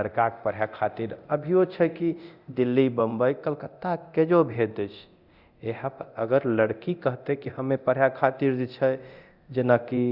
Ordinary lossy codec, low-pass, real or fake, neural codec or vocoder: Opus, 64 kbps; 5.4 kHz; fake; codec, 24 kHz, 0.9 kbps, DualCodec